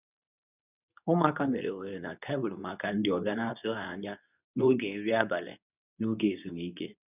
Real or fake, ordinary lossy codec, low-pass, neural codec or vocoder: fake; none; 3.6 kHz; codec, 24 kHz, 0.9 kbps, WavTokenizer, medium speech release version 2